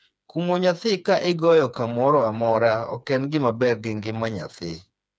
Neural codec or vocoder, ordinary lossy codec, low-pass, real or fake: codec, 16 kHz, 4 kbps, FreqCodec, smaller model; none; none; fake